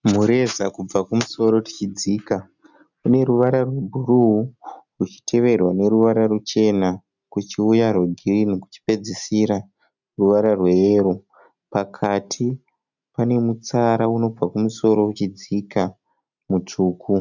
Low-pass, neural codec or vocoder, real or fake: 7.2 kHz; none; real